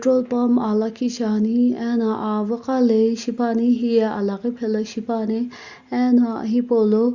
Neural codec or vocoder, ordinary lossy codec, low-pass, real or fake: none; Opus, 64 kbps; 7.2 kHz; real